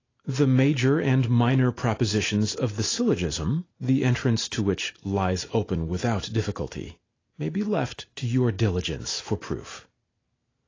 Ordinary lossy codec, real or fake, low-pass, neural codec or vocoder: AAC, 32 kbps; real; 7.2 kHz; none